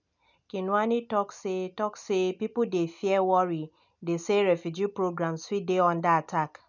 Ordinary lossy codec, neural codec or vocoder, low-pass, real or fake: none; none; 7.2 kHz; real